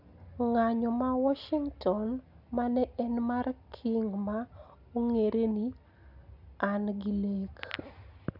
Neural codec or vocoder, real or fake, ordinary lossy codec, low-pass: none; real; none; 5.4 kHz